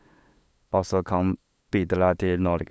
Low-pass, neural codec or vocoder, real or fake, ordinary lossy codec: none; codec, 16 kHz, 8 kbps, FunCodec, trained on LibriTTS, 25 frames a second; fake; none